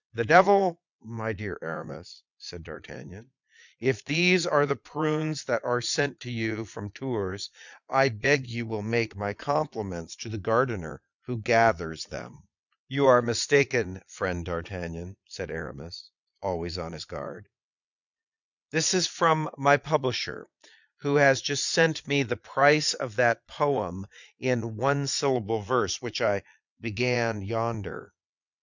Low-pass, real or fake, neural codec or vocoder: 7.2 kHz; fake; vocoder, 22.05 kHz, 80 mel bands, Vocos